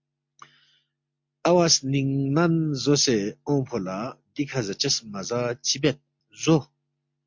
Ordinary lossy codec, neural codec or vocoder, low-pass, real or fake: MP3, 48 kbps; none; 7.2 kHz; real